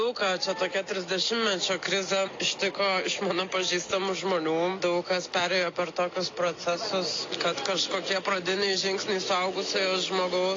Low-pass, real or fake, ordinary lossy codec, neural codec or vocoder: 7.2 kHz; real; AAC, 32 kbps; none